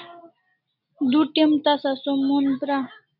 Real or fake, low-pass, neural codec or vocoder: real; 5.4 kHz; none